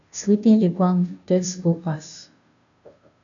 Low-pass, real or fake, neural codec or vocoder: 7.2 kHz; fake; codec, 16 kHz, 0.5 kbps, FunCodec, trained on Chinese and English, 25 frames a second